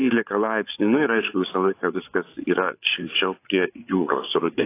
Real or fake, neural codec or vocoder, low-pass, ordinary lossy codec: fake; codec, 16 kHz, 8 kbps, FreqCodec, larger model; 3.6 kHz; AAC, 24 kbps